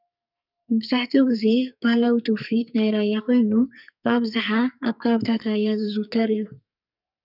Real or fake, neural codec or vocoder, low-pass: fake; codec, 44.1 kHz, 2.6 kbps, SNAC; 5.4 kHz